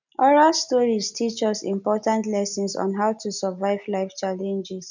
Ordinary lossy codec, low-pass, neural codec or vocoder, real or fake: none; 7.2 kHz; none; real